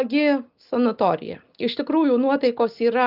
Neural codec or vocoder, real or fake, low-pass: none; real; 5.4 kHz